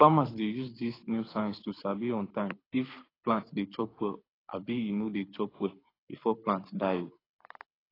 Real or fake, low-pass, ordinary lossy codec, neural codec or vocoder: fake; 5.4 kHz; AAC, 24 kbps; codec, 24 kHz, 6 kbps, HILCodec